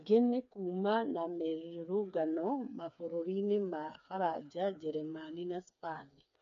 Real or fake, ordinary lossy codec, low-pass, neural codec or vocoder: fake; MP3, 64 kbps; 7.2 kHz; codec, 16 kHz, 4 kbps, FreqCodec, smaller model